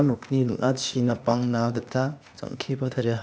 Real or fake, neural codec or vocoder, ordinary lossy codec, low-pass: fake; codec, 16 kHz, 0.8 kbps, ZipCodec; none; none